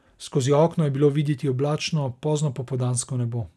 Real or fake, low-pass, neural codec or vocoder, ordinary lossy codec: real; none; none; none